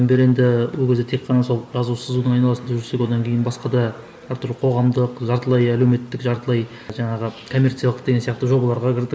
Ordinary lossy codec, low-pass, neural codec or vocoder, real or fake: none; none; none; real